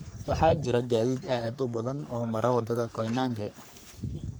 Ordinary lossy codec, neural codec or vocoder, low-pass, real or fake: none; codec, 44.1 kHz, 3.4 kbps, Pupu-Codec; none; fake